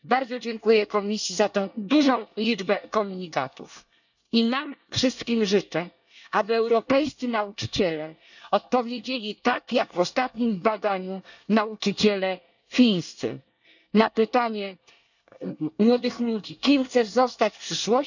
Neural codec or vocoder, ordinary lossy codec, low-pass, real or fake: codec, 24 kHz, 1 kbps, SNAC; none; 7.2 kHz; fake